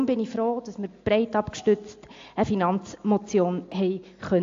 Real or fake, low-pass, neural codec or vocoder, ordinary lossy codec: real; 7.2 kHz; none; AAC, 48 kbps